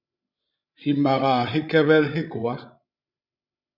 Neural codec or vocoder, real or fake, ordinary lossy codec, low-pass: codec, 16 kHz, 16 kbps, FreqCodec, larger model; fake; Opus, 64 kbps; 5.4 kHz